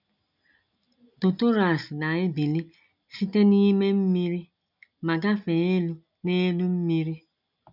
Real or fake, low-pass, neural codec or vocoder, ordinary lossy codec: real; 5.4 kHz; none; none